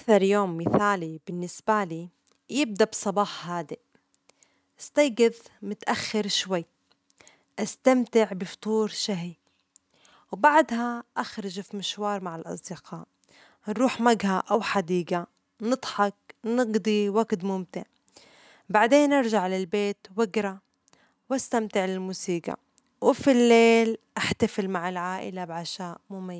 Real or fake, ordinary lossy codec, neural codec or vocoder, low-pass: real; none; none; none